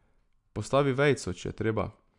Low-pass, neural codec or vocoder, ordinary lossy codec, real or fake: 10.8 kHz; none; none; real